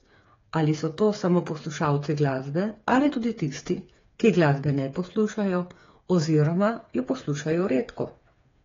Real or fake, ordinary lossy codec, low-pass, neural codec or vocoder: fake; AAC, 32 kbps; 7.2 kHz; codec, 16 kHz, 8 kbps, FreqCodec, smaller model